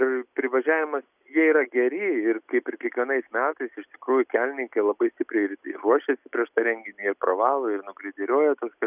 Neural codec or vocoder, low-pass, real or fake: none; 3.6 kHz; real